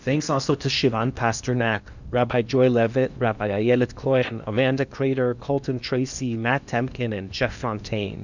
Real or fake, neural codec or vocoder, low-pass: fake; codec, 16 kHz in and 24 kHz out, 0.8 kbps, FocalCodec, streaming, 65536 codes; 7.2 kHz